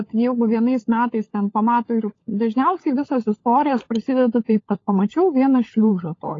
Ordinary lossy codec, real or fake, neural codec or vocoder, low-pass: AAC, 32 kbps; fake; codec, 16 kHz, 16 kbps, FunCodec, trained on LibriTTS, 50 frames a second; 7.2 kHz